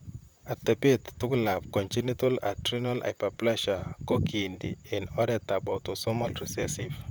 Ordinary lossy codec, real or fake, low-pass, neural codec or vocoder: none; fake; none; vocoder, 44.1 kHz, 128 mel bands, Pupu-Vocoder